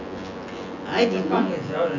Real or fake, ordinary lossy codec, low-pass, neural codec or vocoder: fake; none; 7.2 kHz; vocoder, 24 kHz, 100 mel bands, Vocos